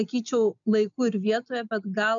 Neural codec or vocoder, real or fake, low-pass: none; real; 7.2 kHz